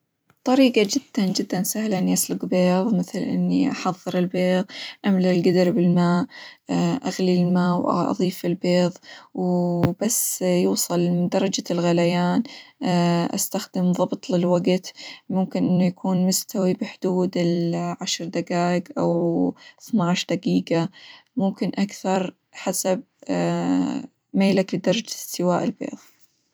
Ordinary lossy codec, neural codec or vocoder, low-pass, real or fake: none; vocoder, 44.1 kHz, 128 mel bands every 256 samples, BigVGAN v2; none; fake